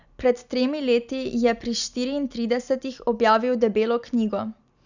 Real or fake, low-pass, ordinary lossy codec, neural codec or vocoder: real; 7.2 kHz; none; none